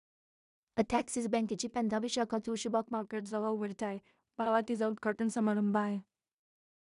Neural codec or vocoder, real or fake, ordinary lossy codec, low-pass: codec, 16 kHz in and 24 kHz out, 0.4 kbps, LongCat-Audio-Codec, two codebook decoder; fake; none; 10.8 kHz